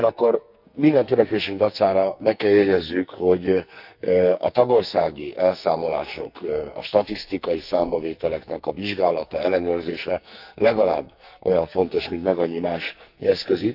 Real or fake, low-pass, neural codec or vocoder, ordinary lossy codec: fake; 5.4 kHz; codec, 44.1 kHz, 2.6 kbps, SNAC; none